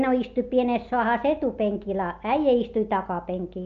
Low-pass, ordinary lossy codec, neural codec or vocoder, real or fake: 7.2 kHz; Opus, 24 kbps; none; real